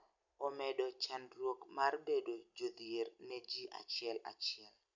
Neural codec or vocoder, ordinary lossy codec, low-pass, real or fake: none; none; 7.2 kHz; real